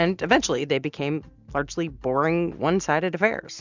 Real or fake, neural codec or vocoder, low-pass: real; none; 7.2 kHz